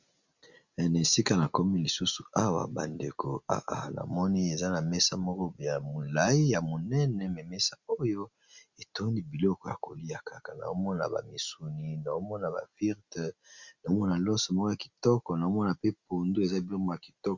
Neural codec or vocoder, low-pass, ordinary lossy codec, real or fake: none; 7.2 kHz; Opus, 64 kbps; real